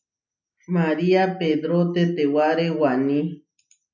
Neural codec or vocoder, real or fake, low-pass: none; real; 7.2 kHz